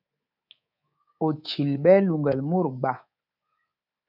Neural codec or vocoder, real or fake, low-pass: codec, 24 kHz, 3.1 kbps, DualCodec; fake; 5.4 kHz